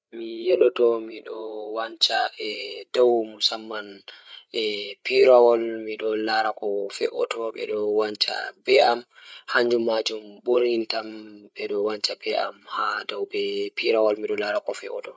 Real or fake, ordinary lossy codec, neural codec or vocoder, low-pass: fake; none; codec, 16 kHz, 8 kbps, FreqCodec, larger model; none